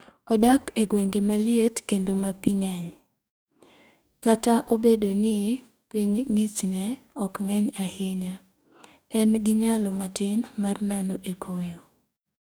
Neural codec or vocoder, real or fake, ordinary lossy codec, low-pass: codec, 44.1 kHz, 2.6 kbps, DAC; fake; none; none